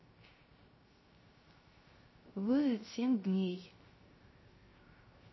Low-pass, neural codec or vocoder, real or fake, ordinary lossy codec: 7.2 kHz; codec, 16 kHz, 0.3 kbps, FocalCodec; fake; MP3, 24 kbps